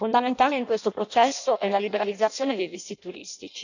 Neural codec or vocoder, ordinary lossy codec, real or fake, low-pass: codec, 16 kHz in and 24 kHz out, 0.6 kbps, FireRedTTS-2 codec; none; fake; 7.2 kHz